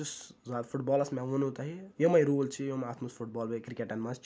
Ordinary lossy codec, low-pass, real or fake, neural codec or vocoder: none; none; real; none